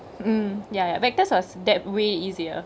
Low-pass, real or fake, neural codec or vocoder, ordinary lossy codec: none; real; none; none